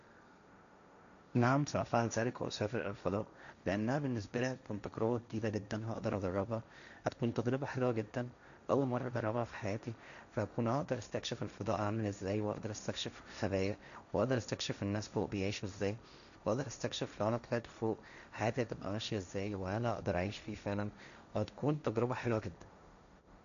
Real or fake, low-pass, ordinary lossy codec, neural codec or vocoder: fake; 7.2 kHz; none; codec, 16 kHz, 1.1 kbps, Voila-Tokenizer